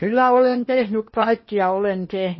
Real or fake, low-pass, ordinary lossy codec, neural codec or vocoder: fake; 7.2 kHz; MP3, 24 kbps; codec, 16 kHz in and 24 kHz out, 0.8 kbps, FocalCodec, streaming, 65536 codes